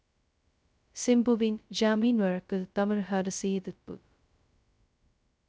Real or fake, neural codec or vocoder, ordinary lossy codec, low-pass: fake; codec, 16 kHz, 0.2 kbps, FocalCodec; none; none